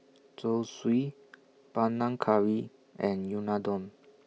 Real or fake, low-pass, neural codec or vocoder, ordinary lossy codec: real; none; none; none